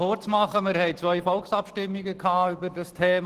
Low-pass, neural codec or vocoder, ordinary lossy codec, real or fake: 14.4 kHz; none; Opus, 16 kbps; real